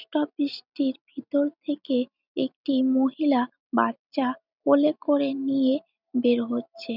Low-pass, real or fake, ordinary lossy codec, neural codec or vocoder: 5.4 kHz; real; none; none